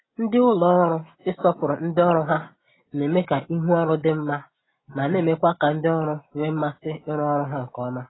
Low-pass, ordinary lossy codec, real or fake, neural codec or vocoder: 7.2 kHz; AAC, 16 kbps; real; none